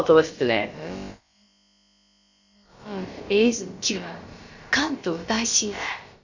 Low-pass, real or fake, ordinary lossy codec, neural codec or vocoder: 7.2 kHz; fake; Opus, 64 kbps; codec, 16 kHz, about 1 kbps, DyCAST, with the encoder's durations